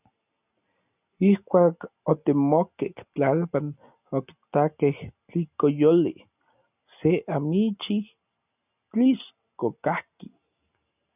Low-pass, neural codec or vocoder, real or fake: 3.6 kHz; none; real